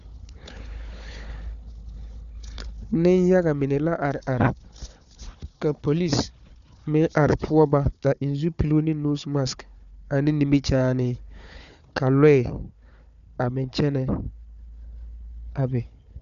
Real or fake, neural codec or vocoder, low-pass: fake; codec, 16 kHz, 4 kbps, FunCodec, trained on Chinese and English, 50 frames a second; 7.2 kHz